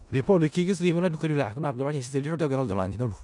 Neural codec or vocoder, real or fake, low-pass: codec, 16 kHz in and 24 kHz out, 0.4 kbps, LongCat-Audio-Codec, four codebook decoder; fake; 10.8 kHz